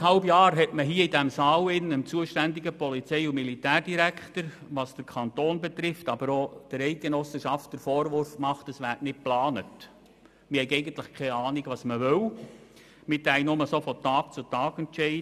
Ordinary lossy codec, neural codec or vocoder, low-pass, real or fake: none; none; 14.4 kHz; real